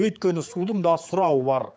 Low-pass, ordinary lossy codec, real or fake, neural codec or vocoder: none; none; fake; codec, 16 kHz, 4 kbps, X-Codec, HuBERT features, trained on general audio